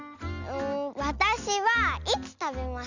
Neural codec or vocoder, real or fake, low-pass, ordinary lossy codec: none; real; 7.2 kHz; none